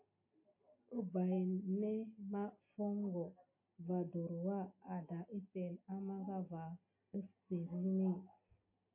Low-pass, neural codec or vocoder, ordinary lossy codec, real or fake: 3.6 kHz; none; MP3, 24 kbps; real